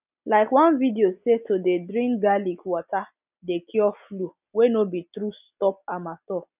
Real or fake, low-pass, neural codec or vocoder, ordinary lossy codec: real; 3.6 kHz; none; none